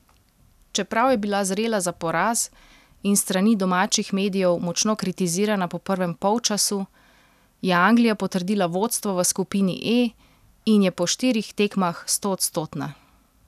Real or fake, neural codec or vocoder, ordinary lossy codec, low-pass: real; none; none; 14.4 kHz